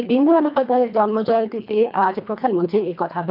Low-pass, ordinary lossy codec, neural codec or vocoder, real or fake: 5.4 kHz; none; codec, 24 kHz, 1.5 kbps, HILCodec; fake